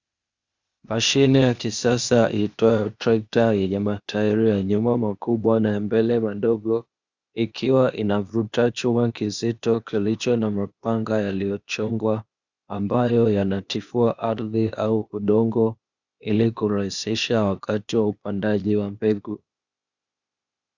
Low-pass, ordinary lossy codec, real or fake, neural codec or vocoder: 7.2 kHz; Opus, 64 kbps; fake; codec, 16 kHz, 0.8 kbps, ZipCodec